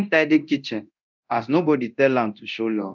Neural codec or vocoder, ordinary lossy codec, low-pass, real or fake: codec, 24 kHz, 0.9 kbps, DualCodec; none; 7.2 kHz; fake